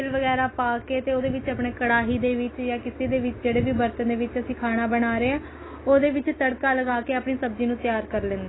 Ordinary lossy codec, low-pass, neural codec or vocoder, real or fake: AAC, 16 kbps; 7.2 kHz; none; real